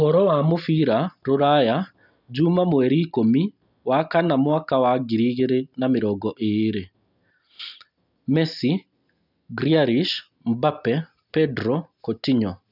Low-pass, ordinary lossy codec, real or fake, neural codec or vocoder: 5.4 kHz; none; real; none